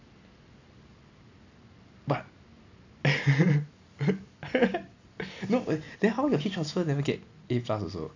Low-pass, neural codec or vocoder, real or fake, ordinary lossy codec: 7.2 kHz; none; real; AAC, 48 kbps